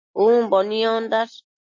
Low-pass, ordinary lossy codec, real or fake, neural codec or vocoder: 7.2 kHz; MP3, 32 kbps; real; none